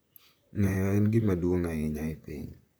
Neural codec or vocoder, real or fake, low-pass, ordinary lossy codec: vocoder, 44.1 kHz, 128 mel bands, Pupu-Vocoder; fake; none; none